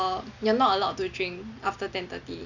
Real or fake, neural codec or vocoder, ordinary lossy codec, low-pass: real; none; none; 7.2 kHz